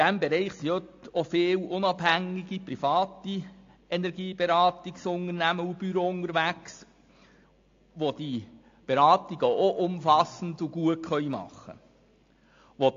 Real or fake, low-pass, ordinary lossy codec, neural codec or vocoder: real; 7.2 kHz; AAC, 48 kbps; none